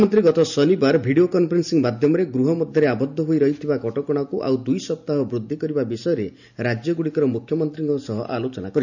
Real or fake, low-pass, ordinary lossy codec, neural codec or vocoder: real; 7.2 kHz; none; none